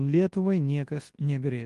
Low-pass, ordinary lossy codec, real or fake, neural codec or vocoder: 10.8 kHz; Opus, 24 kbps; fake; codec, 24 kHz, 0.9 kbps, WavTokenizer, large speech release